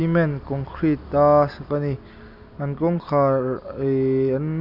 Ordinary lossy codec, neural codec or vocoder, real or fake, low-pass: none; none; real; 5.4 kHz